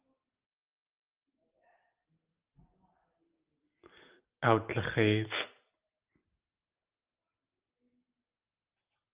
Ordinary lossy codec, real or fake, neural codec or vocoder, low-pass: Opus, 24 kbps; real; none; 3.6 kHz